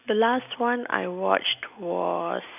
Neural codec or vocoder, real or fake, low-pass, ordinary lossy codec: none; real; 3.6 kHz; none